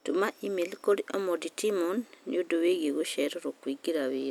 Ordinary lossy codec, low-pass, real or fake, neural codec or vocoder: none; 19.8 kHz; real; none